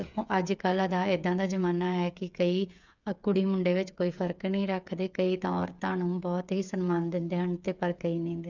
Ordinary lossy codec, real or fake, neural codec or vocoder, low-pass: none; fake; codec, 16 kHz, 8 kbps, FreqCodec, smaller model; 7.2 kHz